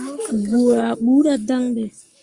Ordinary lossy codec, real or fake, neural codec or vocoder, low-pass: Opus, 32 kbps; fake; codec, 44.1 kHz, 7.8 kbps, Pupu-Codec; 10.8 kHz